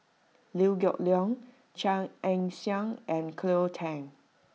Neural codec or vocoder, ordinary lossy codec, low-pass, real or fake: none; none; none; real